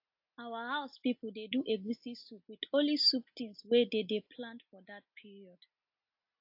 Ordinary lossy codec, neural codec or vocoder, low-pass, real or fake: AAC, 48 kbps; none; 5.4 kHz; real